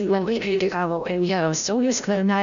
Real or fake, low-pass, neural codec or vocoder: fake; 7.2 kHz; codec, 16 kHz, 0.5 kbps, FreqCodec, larger model